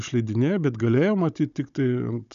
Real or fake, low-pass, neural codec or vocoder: fake; 7.2 kHz; codec, 16 kHz, 16 kbps, FunCodec, trained on Chinese and English, 50 frames a second